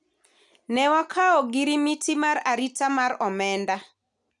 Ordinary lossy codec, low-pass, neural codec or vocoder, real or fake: none; 10.8 kHz; none; real